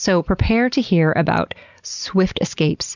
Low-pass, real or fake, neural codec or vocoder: 7.2 kHz; real; none